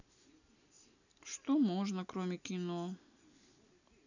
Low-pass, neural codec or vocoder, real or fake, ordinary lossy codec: 7.2 kHz; none; real; none